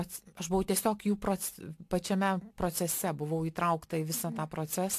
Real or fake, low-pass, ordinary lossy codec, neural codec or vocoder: real; 14.4 kHz; AAC, 64 kbps; none